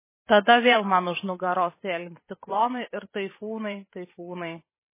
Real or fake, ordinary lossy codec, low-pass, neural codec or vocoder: fake; MP3, 16 kbps; 3.6 kHz; vocoder, 44.1 kHz, 128 mel bands every 512 samples, BigVGAN v2